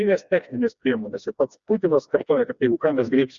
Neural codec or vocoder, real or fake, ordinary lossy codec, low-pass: codec, 16 kHz, 1 kbps, FreqCodec, smaller model; fake; Opus, 64 kbps; 7.2 kHz